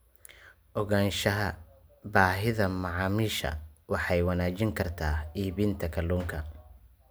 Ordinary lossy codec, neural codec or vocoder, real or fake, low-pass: none; none; real; none